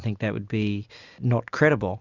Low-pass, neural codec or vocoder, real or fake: 7.2 kHz; none; real